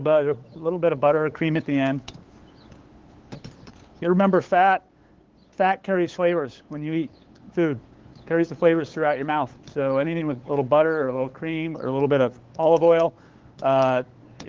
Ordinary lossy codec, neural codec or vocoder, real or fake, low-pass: Opus, 16 kbps; codec, 16 kHz, 8 kbps, FunCodec, trained on LibriTTS, 25 frames a second; fake; 7.2 kHz